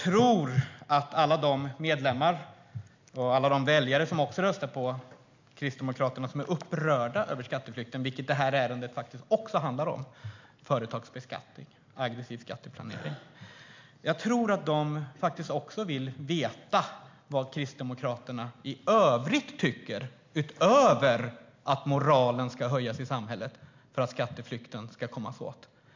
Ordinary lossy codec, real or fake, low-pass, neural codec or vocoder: MP3, 64 kbps; real; 7.2 kHz; none